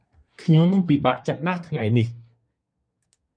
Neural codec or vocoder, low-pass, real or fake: codec, 24 kHz, 1 kbps, SNAC; 9.9 kHz; fake